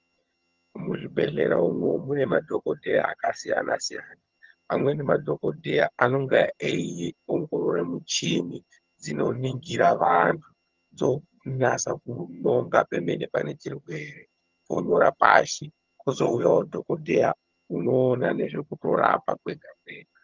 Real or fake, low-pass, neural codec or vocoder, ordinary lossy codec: fake; 7.2 kHz; vocoder, 22.05 kHz, 80 mel bands, HiFi-GAN; Opus, 32 kbps